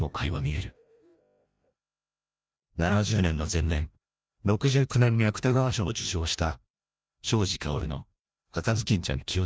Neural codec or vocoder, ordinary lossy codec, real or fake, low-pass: codec, 16 kHz, 1 kbps, FreqCodec, larger model; none; fake; none